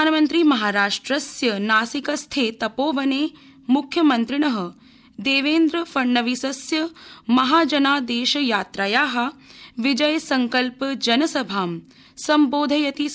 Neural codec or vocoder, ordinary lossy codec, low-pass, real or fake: none; none; none; real